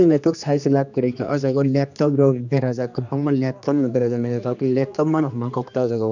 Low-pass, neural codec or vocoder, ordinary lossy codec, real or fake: 7.2 kHz; codec, 16 kHz, 2 kbps, X-Codec, HuBERT features, trained on general audio; none; fake